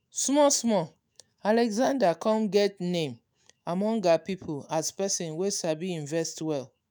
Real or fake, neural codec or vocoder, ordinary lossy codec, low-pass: fake; autoencoder, 48 kHz, 128 numbers a frame, DAC-VAE, trained on Japanese speech; none; none